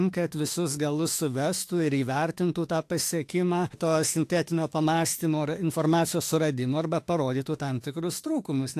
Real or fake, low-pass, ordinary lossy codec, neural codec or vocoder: fake; 14.4 kHz; AAC, 64 kbps; autoencoder, 48 kHz, 32 numbers a frame, DAC-VAE, trained on Japanese speech